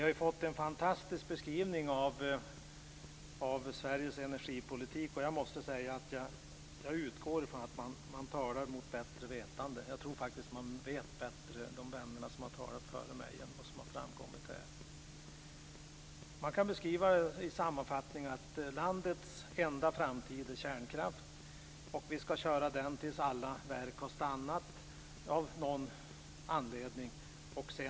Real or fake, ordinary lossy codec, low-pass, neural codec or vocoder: real; none; none; none